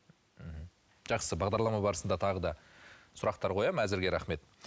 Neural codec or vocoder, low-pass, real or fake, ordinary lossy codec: none; none; real; none